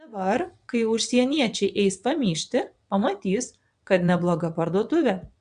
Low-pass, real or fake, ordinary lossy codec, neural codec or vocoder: 9.9 kHz; fake; MP3, 96 kbps; vocoder, 22.05 kHz, 80 mel bands, WaveNeXt